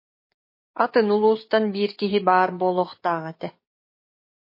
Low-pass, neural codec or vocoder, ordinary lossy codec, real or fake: 5.4 kHz; codec, 44.1 kHz, 7.8 kbps, DAC; MP3, 24 kbps; fake